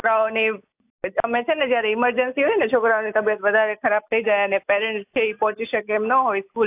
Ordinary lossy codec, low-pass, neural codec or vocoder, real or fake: AAC, 32 kbps; 3.6 kHz; none; real